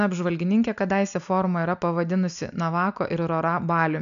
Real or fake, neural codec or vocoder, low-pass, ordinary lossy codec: real; none; 7.2 kHz; MP3, 96 kbps